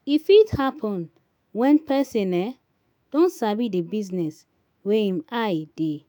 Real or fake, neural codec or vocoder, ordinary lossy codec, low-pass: fake; autoencoder, 48 kHz, 128 numbers a frame, DAC-VAE, trained on Japanese speech; none; none